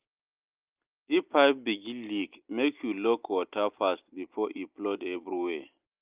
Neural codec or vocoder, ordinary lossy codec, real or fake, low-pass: none; Opus, 24 kbps; real; 3.6 kHz